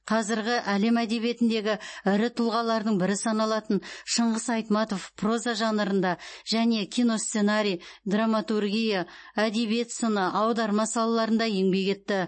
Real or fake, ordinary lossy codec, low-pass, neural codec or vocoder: real; MP3, 32 kbps; 9.9 kHz; none